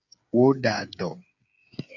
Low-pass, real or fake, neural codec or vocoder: 7.2 kHz; fake; codec, 16 kHz, 8 kbps, FreqCodec, smaller model